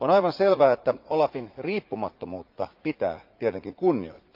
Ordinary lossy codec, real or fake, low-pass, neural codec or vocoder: Opus, 32 kbps; fake; 5.4 kHz; vocoder, 44.1 kHz, 80 mel bands, Vocos